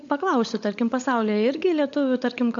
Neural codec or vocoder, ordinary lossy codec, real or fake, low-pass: codec, 16 kHz, 16 kbps, FunCodec, trained on LibriTTS, 50 frames a second; MP3, 48 kbps; fake; 7.2 kHz